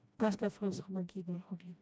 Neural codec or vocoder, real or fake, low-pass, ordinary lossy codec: codec, 16 kHz, 1 kbps, FreqCodec, smaller model; fake; none; none